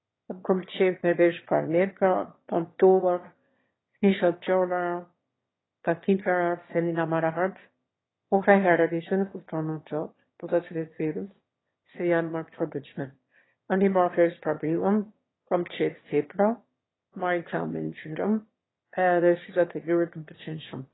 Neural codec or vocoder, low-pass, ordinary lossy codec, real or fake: autoencoder, 22.05 kHz, a latent of 192 numbers a frame, VITS, trained on one speaker; 7.2 kHz; AAC, 16 kbps; fake